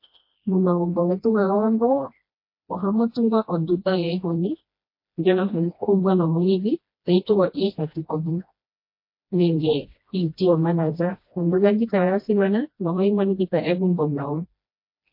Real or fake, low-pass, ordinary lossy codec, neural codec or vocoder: fake; 5.4 kHz; MP3, 32 kbps; codec, 16 kHz, 1 kbps, FreqCodec, smaller model